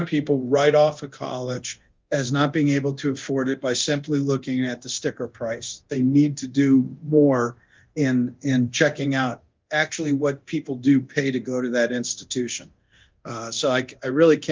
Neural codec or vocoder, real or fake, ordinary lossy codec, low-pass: codec, 24 kHz, 0.9 kbps, DualCodec; fake; Opus, 32 kbps; 7.2 kHz